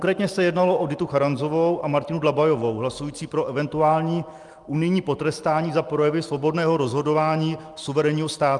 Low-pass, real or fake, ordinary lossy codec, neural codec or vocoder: 10.8 kHz; real; Opus, 32 kbps; none